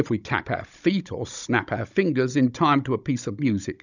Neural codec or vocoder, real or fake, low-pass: codec, 16 kHz, 16 kbps, FunCodec, trained on Chinese and English, 50 frames a second; fake; 7.2 kHz